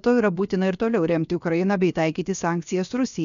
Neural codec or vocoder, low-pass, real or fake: codec, 16 kHz, about 1 kbps, DyCAST, with the encoder's durations; 7.2 kHz; fake